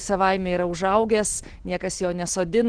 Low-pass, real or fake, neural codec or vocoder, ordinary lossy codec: 9.9 kHz; real; none; Opus, 16 kbps